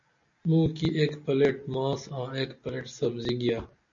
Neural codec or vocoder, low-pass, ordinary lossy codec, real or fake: none; 7.2 kHz; MP3, 64 kbps; real